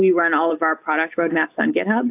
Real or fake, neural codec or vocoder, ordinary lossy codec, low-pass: real; none; AAC, 32 kbps; 3.6 kHz